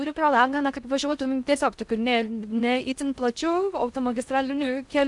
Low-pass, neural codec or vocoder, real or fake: 10.8 kHz; codec, 16 kHz in and 24 kHz out, 0.6 kbps, FocalCodec, streaming, 2048 codes; fake